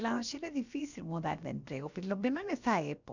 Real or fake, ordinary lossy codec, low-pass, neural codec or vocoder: fake; none; 7.2 kHz; codec, 16 kHz, about 1 kbps, DyCAST, with the encoder's durations